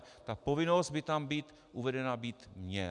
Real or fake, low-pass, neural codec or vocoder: real; 10.8 kHz; none